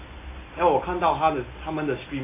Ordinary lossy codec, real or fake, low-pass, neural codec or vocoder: AAC, 24 kbps; real; 3.6 kHz; none